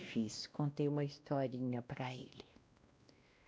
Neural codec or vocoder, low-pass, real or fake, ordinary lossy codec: codec, 16 kHz, 1 kbps, X-Codec, WavLM features, trained on Multilingual LibriSpeech; none; fake; none